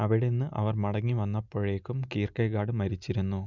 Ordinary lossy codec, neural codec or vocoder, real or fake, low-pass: none; none; real; 7.2 kHz